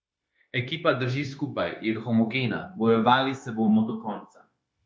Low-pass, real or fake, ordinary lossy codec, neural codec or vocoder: none; fake; none; codec, 16 kHz, 0.9 kbps, LongCat-Audio-Codec